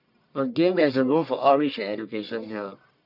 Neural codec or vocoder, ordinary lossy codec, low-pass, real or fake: codec, 44.1 kHz, 1.7 kbps, Pupu-Codec; none; 5.4 kHz; fake